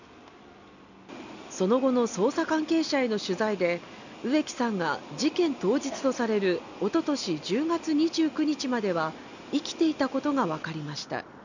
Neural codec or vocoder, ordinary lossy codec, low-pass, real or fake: none; none; 7.2 kHz; real